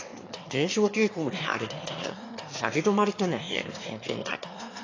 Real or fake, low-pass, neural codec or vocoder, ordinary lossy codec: fake; 7.2 kHz; autoencoder, 22.05 kHz, a latent of 192 numbers a frame, VITS, trained on one speaker; AAC, 32 kbps